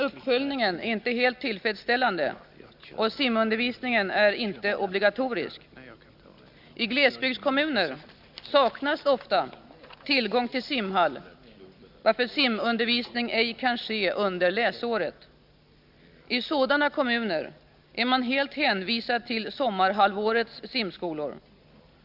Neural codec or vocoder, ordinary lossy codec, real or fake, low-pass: none; Opus, 64 kbps; real; 5.4 kHz